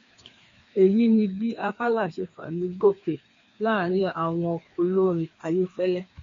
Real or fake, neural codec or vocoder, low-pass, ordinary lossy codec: fake; codec, 16 kHz, 2 kbps, FreqCodec, larger model; 7.2 kHz; MP3, 48 kbps